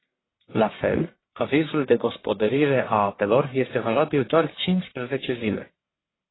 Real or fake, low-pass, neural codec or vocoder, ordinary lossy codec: fake; 7.2 kHz; codec, 44.1 kHz, 1.7 kbps, Pupu-Codec; AAC, 16 kbps